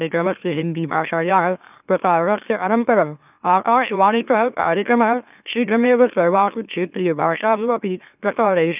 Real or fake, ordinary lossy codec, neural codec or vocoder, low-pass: fake; none; autoencoder, 44.1 kHz, a latent of 192 numbers a frame, MeloTTS; 3.6 kHz